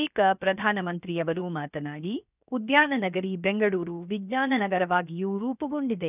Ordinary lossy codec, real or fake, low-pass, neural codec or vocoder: none; fake; 3.6 kHz; codec, 16 kHz, 0.7 kbps, FocalCodec